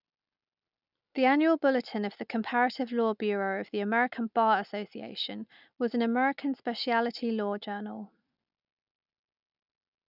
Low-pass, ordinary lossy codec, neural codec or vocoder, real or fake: 5.4 kHz; none; none; real